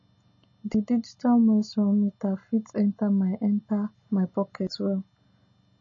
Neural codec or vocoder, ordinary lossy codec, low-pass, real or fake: none; MP3, 32 kbps; 7.2 kHz; real